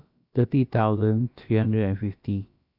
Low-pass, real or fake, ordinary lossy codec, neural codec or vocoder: 5.4 kHz; fake; AAC, 48 kbps; codec, 16 kHz, about 1 kbps, DyCAST, with the encoder's durations